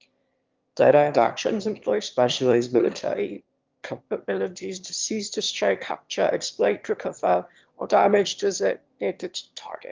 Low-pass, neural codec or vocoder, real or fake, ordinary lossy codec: 7.2 kHz; autoencoder, 22.05 kHz, a latent of 192 numbers a frame, VITS, trained on one speaker; fake; Opus, 32 kbps